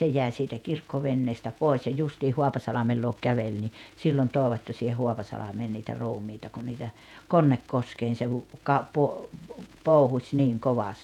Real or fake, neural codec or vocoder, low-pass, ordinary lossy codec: fake; vocoder, 48 kHz, 128 mel bands, Vocos; 19.8 kHz; none